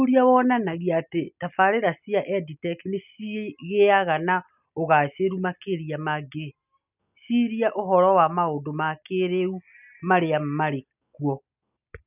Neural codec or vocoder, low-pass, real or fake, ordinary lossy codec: none; 3.6 kHz; real; none